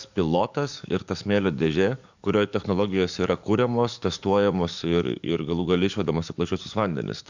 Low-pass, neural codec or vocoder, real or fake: 7.2 kHz; codec, 44.1 kHz, 7.8 kbps, DAC; fake